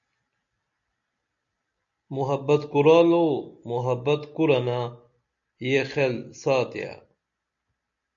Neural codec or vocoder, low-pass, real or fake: none; 7.2 kHz; real